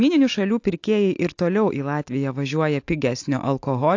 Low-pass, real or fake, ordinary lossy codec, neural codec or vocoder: 7.2 kHz; real; AAC, 48 kbps; none